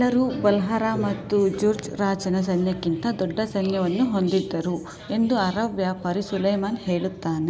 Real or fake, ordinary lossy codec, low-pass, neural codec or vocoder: real; none; none; none